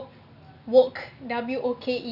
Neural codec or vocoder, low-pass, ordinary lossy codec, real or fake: none; 5.4 kHz; none; real